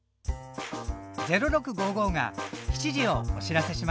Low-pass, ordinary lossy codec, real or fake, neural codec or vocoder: none; none; real; none